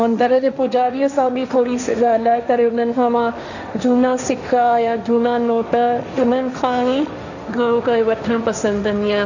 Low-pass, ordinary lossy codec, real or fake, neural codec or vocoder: 7.2 kHz; none; fake; codec, 16 kHz, 1.1 kbps, Voila-Tokenizer